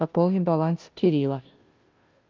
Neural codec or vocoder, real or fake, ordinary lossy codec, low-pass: codec, 16 kHz, 0.5 kbps, FunCodec, trained on Chinese and English, 25 frames a second; fake; Opus, 24 kbps; 7.2 kHz